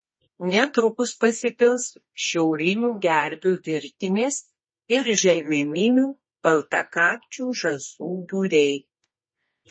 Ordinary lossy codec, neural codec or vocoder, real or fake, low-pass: MP3, 32 kbps; codec, 24 kHz, 0.9 kbps, WavTokenizer, medium music audio release; fake; 9.9 kHz